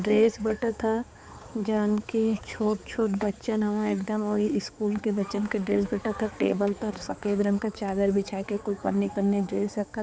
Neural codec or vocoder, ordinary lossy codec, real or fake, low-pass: codec, 16 kHz, 4 kbps, X-Codec, HuBERT features, trained on balanced general audio; none; fake; none